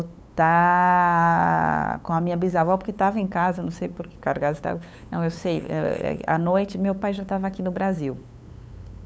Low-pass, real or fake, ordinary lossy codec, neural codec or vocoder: none; fake; none; codec, 16 kHz, 8 kbps, FunCodec, trained on LibriTTS, 25 frames a second